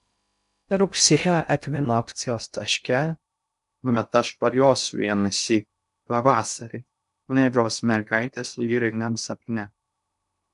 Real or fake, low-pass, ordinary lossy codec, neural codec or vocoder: fake; 10.8 kHz; AAC, 96 kbps; codec, 16 kHz in and 24 kHz out, 0.6 kbps, FocalCodec, streaming, 2048 codes